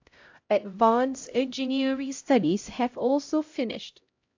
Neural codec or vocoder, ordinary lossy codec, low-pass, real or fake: codec, 16 kHz, 0.5 kbps, X-Codec, HuBERT features, trained on LibriSpeech; AAC, 48 kbps; 7.2 kHz; fake